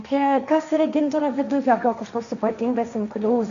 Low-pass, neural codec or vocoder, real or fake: 7.2 kHz; codec, 16 kHz, 1.1 kbps, Voila-Tokenizer; fake